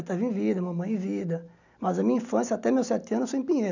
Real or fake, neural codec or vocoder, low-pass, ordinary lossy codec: real; none; 7.2 kHz; none